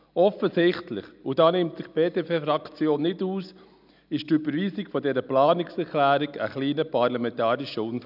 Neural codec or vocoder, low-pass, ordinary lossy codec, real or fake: none; 5.4 kHz; none; real